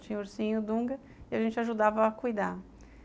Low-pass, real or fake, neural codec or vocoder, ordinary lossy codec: none; real; none; none